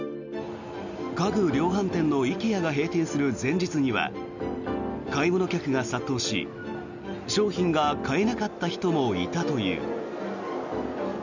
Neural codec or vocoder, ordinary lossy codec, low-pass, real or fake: none; none; 7.2 kHz; real